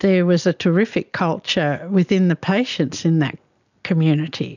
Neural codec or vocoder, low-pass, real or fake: none; 7.2 kHz; real